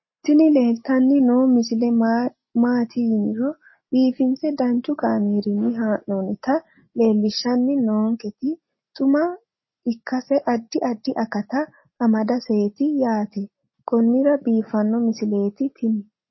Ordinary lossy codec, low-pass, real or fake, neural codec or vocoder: MP3, 24 kbps; 7.2 kHz; real; none